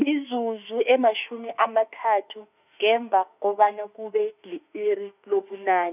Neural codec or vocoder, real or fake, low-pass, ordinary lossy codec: autoencoder, 48 kHz, 32 numbers a frame, DAC-VAE, trained on Japanese speech; fake; 3.6 kHz; none